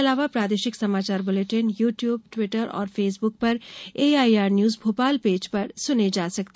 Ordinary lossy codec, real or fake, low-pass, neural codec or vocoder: none; real; none; none